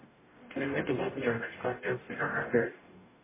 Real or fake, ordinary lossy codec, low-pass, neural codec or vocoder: fake; MP3, 16 kbps; 3.6 kHz; codec, 44.1 kHz, 0.9 kbps, DAC